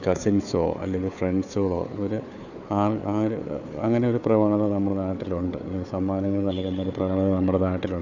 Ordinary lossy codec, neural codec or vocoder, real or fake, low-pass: none; codec, 16 kHz, 4 kbps, FunCodec, trained on Chinese and English, 50 frames a second; fake; 7.2 kHz